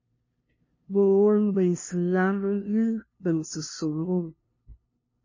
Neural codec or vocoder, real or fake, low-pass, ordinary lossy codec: codec, 16 kHz, 0.5 kbps, FunCodec, trained on LibriTTS, 25 frames a second; fake; 7.2 kHz; MP3, 32 kbps